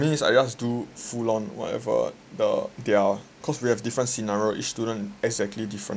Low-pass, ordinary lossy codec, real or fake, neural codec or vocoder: none; none; real; none